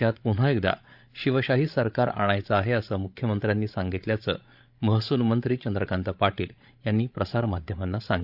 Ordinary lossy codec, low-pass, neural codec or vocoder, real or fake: AAC, 48 kbps; 5.4 kHz; codec, 16 kHz, 16 kbps, FreqCodec, larger model; fake